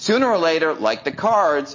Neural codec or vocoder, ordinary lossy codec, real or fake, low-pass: none; MP3, 32 kbps; real; 7.2 kHz